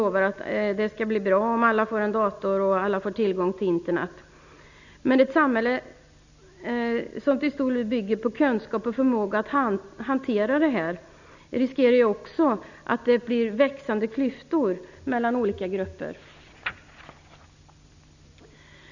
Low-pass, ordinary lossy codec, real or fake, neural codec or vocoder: 7.2 kHz; none; real; none